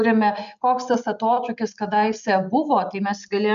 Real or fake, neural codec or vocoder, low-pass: real; none; 7.2 kHz